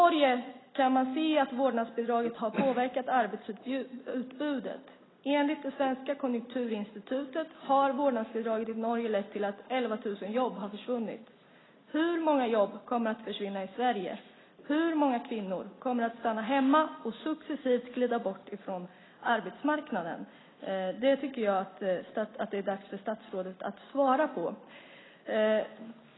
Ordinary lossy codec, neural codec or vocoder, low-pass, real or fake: AAC, 16 kbps; none; 7.2 kHz; real